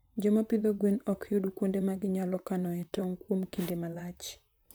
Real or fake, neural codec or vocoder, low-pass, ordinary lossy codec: fake; vocoder, 44.1 kHz, 128 mel bands, Pupu-Vocoder; none; none